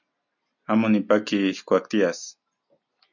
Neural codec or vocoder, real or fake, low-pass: none; real; 7.2 kHz